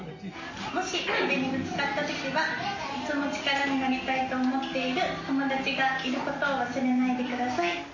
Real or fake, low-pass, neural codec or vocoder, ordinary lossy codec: real; 7.2 kHz; none; MP3, 32 kbps